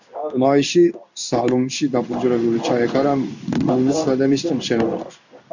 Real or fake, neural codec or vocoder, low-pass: fake; codec, 16 kHz in and 24 kHz out, 1 kbps, XY-Tokenizer; 7.2 kHz